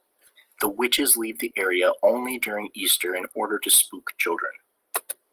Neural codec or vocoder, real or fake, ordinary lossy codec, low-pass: none; real; Opus, 24 kbps; 14.4 kHz